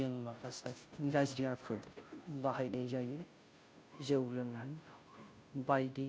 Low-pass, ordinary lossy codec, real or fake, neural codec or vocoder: none; none; fake; codec, 16 kHz, 0.5 kbps, FunCodec, trained on Chinese and English, 25 frames a second